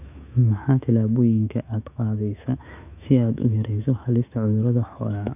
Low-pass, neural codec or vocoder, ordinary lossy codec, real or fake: 3.6 kHz; autoencoder, 48 kHz, 128 numbers a frame, DAC-VAE, trained on Japanese speech; none; fake